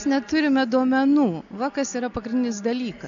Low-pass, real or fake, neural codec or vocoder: 7.2 kHz; real; none